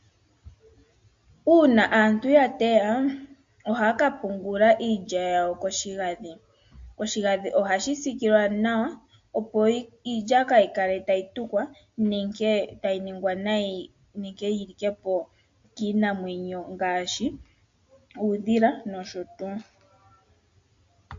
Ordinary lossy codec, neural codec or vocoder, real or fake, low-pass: MP3, 48 kbps; none; real; 7.2 kHz